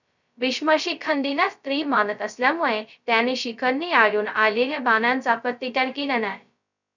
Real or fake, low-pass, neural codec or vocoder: fake; 7.2 kHz; codec, 16 kHz, 0.2 kbps, FocalCodec